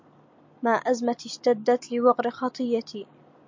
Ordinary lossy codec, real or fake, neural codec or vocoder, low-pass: MP3, 48 kbps; real; none; 7.2 kHz